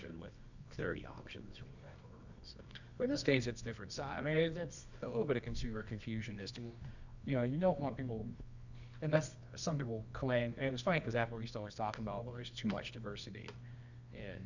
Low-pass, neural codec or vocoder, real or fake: 7.2 kHz; codec, 24 kHz, 0.9 kbps, WavTokenizer, medium music audio release; fake